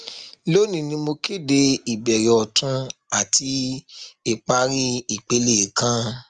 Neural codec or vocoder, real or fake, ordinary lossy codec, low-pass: none; real; Opus, 32 kbps; 10.8 kHz